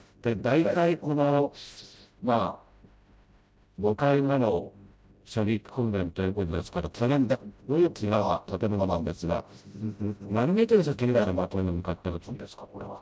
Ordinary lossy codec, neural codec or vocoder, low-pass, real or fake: none; codec, 16 kHz, 0.5 kbps, FreqCodec, smaller model; none; fake